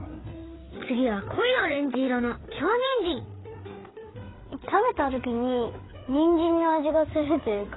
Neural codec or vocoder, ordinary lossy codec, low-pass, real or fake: codec, 16 kHz, 4 kbps, FreqCodec, larger model; AAC, 16 kbps; 7.2 kHz; fake